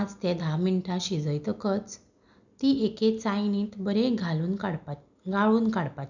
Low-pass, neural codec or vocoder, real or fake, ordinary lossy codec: 7.2 kHz; none; real; none